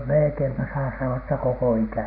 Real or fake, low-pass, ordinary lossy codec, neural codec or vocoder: real; 5.4 kHz; none; none